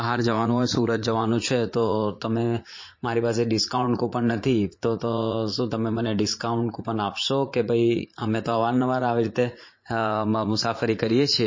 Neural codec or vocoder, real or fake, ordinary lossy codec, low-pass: vocoder, 44.1 kHz, 80 mel bands, Vocos; fake; MP3, 32 kbps; 7.2 kHz